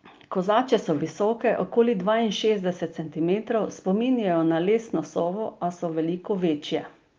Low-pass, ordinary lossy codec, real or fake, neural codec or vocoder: 7.2 kHz; Opus, 32 kbps; real; none